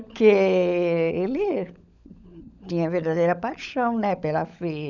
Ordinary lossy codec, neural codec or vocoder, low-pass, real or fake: none; codec, 16 kHz, 16 kbps, FunCodec, trained on LibriTTS, 50 frames a second; 7.2 kHz; fake